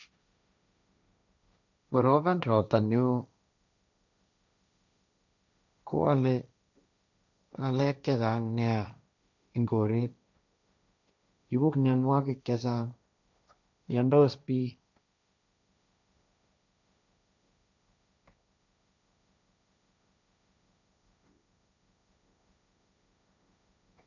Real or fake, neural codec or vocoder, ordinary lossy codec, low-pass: fake; codec, 16 kHz, 1.1 kbps, Voila-Tokenizer; none; 7.2 kHz